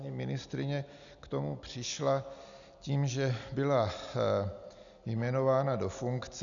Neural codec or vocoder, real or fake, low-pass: none; real; 7.2 kHz